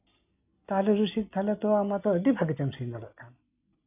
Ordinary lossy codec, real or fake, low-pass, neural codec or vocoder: none; real; 3.6 kHz; none